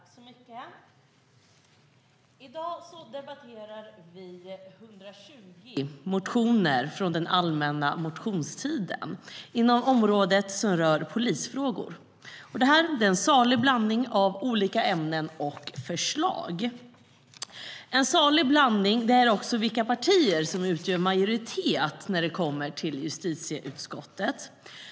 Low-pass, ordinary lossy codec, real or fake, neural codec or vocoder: none; none; real; none